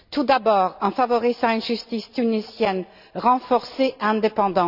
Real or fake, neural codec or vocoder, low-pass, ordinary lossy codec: real; none; 5.4 kHz; none